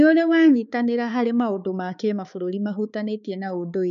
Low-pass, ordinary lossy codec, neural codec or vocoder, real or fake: 7.2 kHz; none; codec, 16 kHz, 4 kbps, X-Codec, HuBERT features, trained on balanced general audio; fake